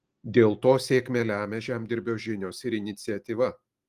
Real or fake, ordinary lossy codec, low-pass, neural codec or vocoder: real; Opus, 24 kbps; 14.4 kHz; none